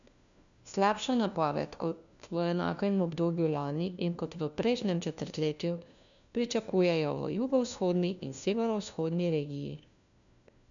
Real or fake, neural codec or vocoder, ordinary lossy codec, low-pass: fake; codec, 16 kHz, 1 kbps, FunCodec, trained on LibriTTS, 50 frames a second; none; 7.2 kHz